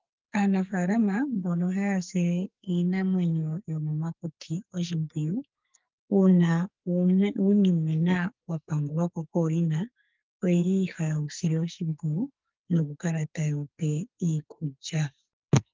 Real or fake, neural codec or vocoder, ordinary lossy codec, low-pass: fake; codec, 44.1 kHz, 2.6 kbps, SNAC; Opus, 32 kbps; 7.2 kHz